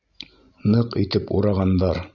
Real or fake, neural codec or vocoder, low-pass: real; none; 7.2 kHz